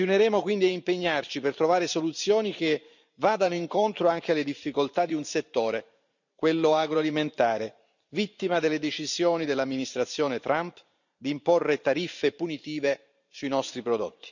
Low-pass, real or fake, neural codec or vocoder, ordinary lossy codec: 7.2 kHz; fake; vocoder, 44.1 kHz, 128 mel bands every 512 samples, BigVGAN v2; none